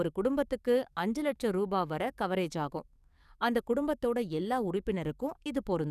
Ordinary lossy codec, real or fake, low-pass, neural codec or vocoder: none; fake; 14.4 kHz; codec, 44.1 kHz, 7.8 kbps, DAC